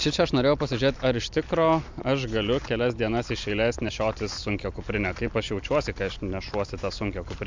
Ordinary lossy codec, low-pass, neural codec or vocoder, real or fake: MP3, 64 kbps; 7.2 kHz; none; real